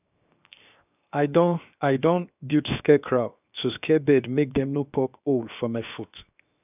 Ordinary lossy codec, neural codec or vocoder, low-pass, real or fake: none; codec, 16 kHz, 0.7 kbps, FocalCodec; 3.6 kHz; fake